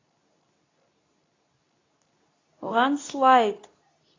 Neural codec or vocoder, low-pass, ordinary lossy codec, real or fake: codec, 24 kHz, 0.9 kbps, WavTokenizer, medium speech release version 2; 7.2 kHz; AAC, 32 kbps; fake